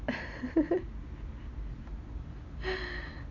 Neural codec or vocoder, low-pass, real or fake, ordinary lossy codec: none; 7.2 kHz; real; none